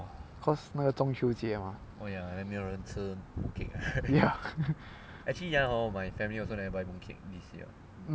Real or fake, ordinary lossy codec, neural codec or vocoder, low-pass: real; none; none; none